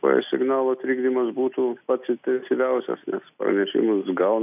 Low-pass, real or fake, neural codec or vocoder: 3.6 kHz; real; none